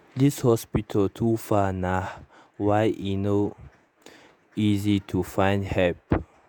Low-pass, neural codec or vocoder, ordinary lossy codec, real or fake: none; vocoder, 48 kHz, 128 mel bands, Vocos; none; fake